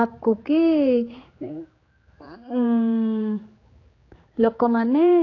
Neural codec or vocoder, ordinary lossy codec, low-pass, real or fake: codec, 16 kHz, 4 kbps, X-Codec, HuBERT features, trained on general audio; AAC, 32 kbps; 7.2 kHz; fake